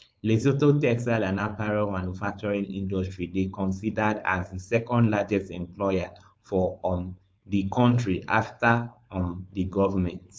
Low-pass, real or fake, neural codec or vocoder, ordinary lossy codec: none; fake; codec, 16 kHz, 4.8 kbps, FACodec; none